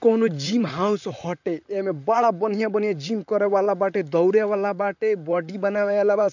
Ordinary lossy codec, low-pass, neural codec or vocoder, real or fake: none; 7.2 kHz; vocoder, 44.1 kHz, 128 mel bands, Pupu-Vocoder; fake